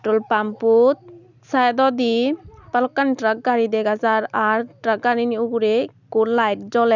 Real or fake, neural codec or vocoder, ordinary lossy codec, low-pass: real; none; none; 7.2 kHz